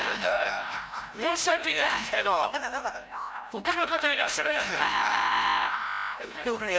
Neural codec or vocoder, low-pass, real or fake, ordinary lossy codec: codec, 16 kHz, 0.5 kbps, FreqCodec, larger model; none; fake; none